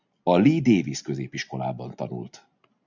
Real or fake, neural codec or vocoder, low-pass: real; none; 7.2 kHz